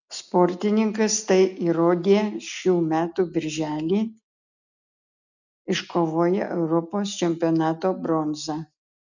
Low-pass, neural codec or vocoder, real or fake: 7.2 kHz; none; real